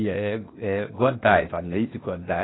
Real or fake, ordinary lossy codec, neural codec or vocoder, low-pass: fake; AAC, 16 kbps; codec, 16 kHz, 0.8 kbps, ZipCodec; 7.2 kHz